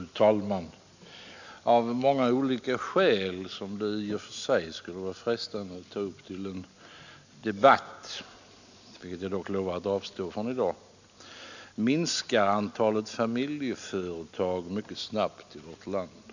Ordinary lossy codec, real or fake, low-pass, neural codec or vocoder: none; real; 7.2 kHz; none